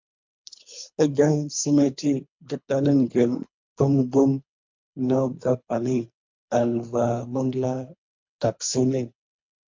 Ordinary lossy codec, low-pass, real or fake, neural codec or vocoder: MP3, 64 kbps; 7.2 kHz; fake; codec, 24 kHz, 3 kbps, HILCodec